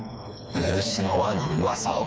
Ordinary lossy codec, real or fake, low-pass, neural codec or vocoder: none; fake; none; codec, 16 kHz, 2 kbps, FreqCodec, smaller model